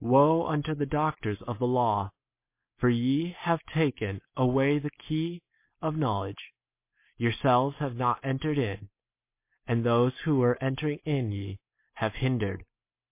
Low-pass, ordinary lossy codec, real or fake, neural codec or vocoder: 3.6 kHz; MP3, 24 kbps; real; none